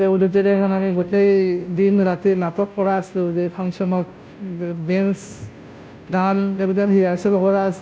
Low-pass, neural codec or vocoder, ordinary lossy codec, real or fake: none; codec, 16 kHz, 0.5 kbps, FunCodec, trained on Chinese and English, 25 frames a second; none; fake